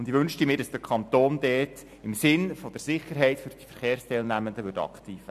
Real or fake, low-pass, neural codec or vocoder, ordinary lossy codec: real; 14.4 kHz; none; AAC, 96 kbps